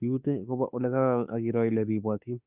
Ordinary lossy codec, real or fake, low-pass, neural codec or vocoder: Opus, 64 kbps; fake; 3.6 kHz; autoencoder, 48 kHz, 32 numbers a frame, DAC-VAE, trained on Japanese speech